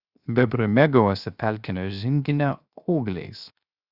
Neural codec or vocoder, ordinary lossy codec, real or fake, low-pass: codec, 16 kHz, 0.7 kbps, FocalCodec; Opus, 64 kbps; fake; 5.4 kHz